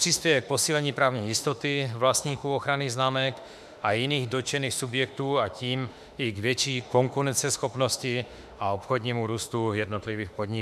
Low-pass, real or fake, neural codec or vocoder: 14.4 kHz; fake; autoencoder, 48 kHz, 32 numbers a frame, DAC-VAE, trained on Japanese speech